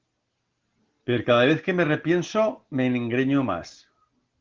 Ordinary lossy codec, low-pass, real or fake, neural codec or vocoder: Opus, 16 kbps; 7.2 kHz; real; none